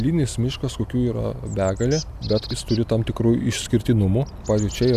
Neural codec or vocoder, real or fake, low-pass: none; real; 14.4 kHz